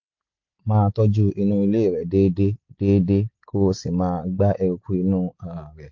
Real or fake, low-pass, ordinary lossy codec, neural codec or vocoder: real; 7.2 kHz; MP3, 48 kbps; none